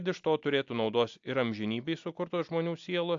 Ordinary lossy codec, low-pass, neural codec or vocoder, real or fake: AAC, 64 kbps; 7.2 kHz; none; real